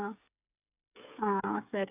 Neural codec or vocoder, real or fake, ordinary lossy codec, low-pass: codec, 16 kHz, 8 kbps, FreqCodec, smaller model; fake; none; 3.6 kHz